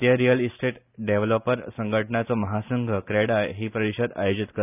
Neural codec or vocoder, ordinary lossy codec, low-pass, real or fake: none; none; 3.6 kHz; real